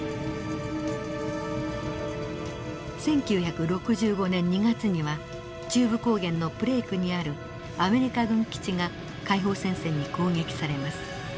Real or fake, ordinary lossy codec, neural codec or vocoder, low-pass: real; none; none; none